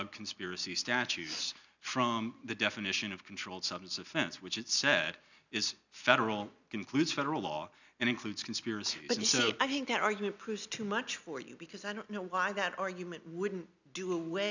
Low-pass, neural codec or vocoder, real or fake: 7.2 kHz; none; real